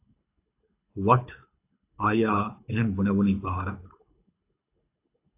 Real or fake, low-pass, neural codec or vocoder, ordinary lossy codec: fake; 3.6 kHz; codec, 24 kHz, 6 kbps, HILCodec; AAC, 24 kbps